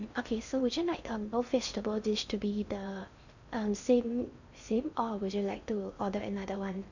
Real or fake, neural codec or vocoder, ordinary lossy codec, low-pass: fake; codec, 16 kHz in and 24 kHz out, 0.6 kbps, FocalCodec, streaming, 4096 codes; none; 7.2 kHz